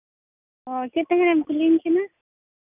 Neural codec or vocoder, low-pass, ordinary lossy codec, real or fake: none; 3.6 kHz; none; real